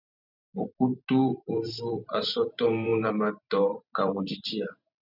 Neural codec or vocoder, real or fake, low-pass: vocoder, 44.1 kHz, 128 mel bands every 512 samples, BigVGAN v2; fake; 5.4 kHz